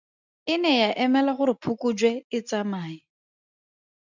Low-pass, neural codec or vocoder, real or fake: 7.2 kHz; none; real